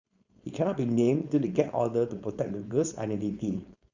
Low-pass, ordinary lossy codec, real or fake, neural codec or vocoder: 7.2 kHz; Opus, 64 kbps; fake; codec, 16 kHz, 4.8 kbps, FACodec